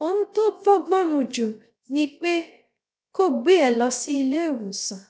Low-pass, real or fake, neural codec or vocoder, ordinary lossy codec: none; fake; codec, 16 kHz, 0.3 kbps, FocalCodec; none